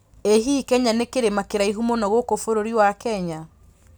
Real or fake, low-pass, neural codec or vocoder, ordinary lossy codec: real; none; none; none